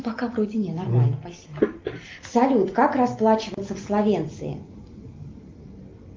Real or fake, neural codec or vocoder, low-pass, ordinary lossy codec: real; none; 7.2 kHz; Opus, 32 kbps